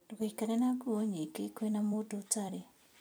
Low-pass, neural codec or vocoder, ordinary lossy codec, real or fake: none; vocoder, 44.1 kHz, 128 mel bands every 256 samples, BigVGAN v2; none; fake